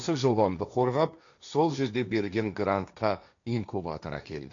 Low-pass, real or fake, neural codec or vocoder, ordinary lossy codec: 7.2 kHz; fake; codec, 16 kHz, 1.1 kbps, Voila-Tokenizer; none